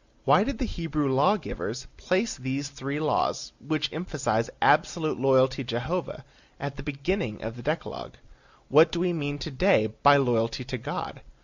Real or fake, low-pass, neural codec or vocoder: real; 7.2 kHz; none